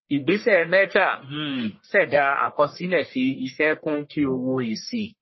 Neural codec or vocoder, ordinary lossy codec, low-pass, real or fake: codec, 44.1 kHz, 1.7 kbps, Pupu-Codec; MP3, 24 kbps; 7.2 kHz; fake